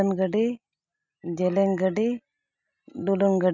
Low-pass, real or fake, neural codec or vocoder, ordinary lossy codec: 7.2 kHz; real; none; none